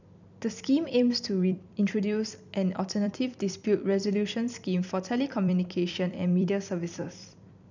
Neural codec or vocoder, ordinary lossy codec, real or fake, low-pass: vocoder, 44.1 kHz, 128 mel bands every 256 samples, BigVGAN v2; none; fake; 7.2 kHz